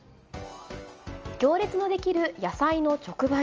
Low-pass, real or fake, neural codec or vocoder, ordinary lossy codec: 7.2 kHz; real; none; Opus, 24 kbps